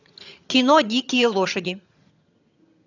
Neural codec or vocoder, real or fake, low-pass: vocoder, 22.05 kHz, 80 mel bands, HiFi-GAN; fake; 7.2 kHz